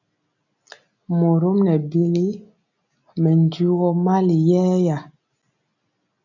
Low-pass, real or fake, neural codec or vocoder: 7.2 kHz; real; none